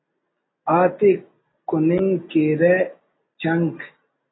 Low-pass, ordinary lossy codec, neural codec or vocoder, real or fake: 7.2 kHz; AAC, 16 kbps; none; real